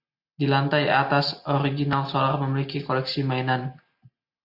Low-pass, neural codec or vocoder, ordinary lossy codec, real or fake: 5.4 kHz; none; AAC, 48 kbps; real